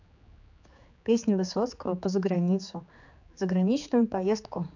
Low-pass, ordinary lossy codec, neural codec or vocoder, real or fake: 7.2 kHz; none; codec, 16 kHz, 4 kbps, X-Codec, HuBERT features, trained on general audio; fake